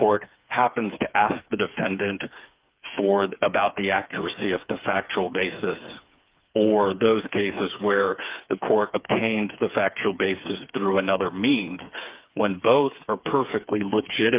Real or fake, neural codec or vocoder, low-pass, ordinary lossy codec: fake; codec, 16 kHz, 4 kbps, FreqCodec, smaller model; 3.6 kHz; Opus, 24 kbps